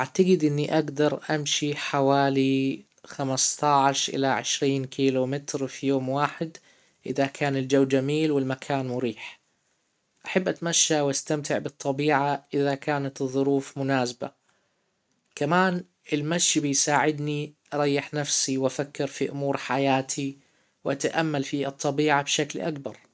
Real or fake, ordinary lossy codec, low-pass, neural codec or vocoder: real; none; none; none